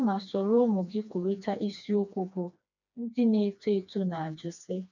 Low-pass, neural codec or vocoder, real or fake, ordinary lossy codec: 7.2 kHz; codec, 16 kHz, 2 kbps, FreqCodec, smaller model; fake; none